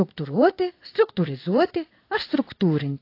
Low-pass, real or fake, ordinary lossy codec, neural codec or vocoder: 5.4 kHz; fake; AAC, 32 kbps; codec, 16 kHz in and 24 kHz out, 1 kbps, XY-Tokenizer